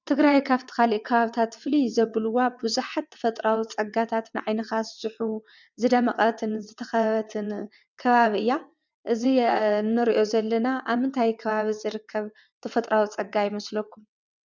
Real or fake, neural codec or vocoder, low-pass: fake; vocoder, 22.05 kHz, 80 mel bands, WaveNeXt; 7.2 kHz